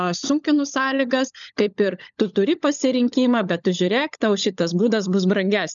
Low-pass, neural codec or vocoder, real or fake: 7.2 kHz; codec, 16 kHz, 16 kbps, FunCodec, trained on LibriTTS, 50 frames a second; fake